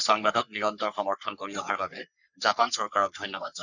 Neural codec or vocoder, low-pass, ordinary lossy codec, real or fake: codec, 44.1 kHz, 3.4 kbps, Pupu-Codec; 7.2 kHz; none; fake